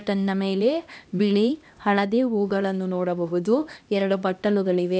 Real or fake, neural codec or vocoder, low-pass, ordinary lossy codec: fake; codec, 16 kHz, 1 kbps, X-Codec, HuBERT features, trained on LibriSpeech; none; none